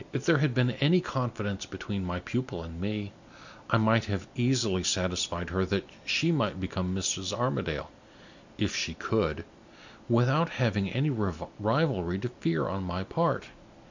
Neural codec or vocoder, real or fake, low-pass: none; real; 7.2 kHz